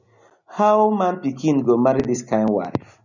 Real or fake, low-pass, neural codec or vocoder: real; 7.2 kHz; none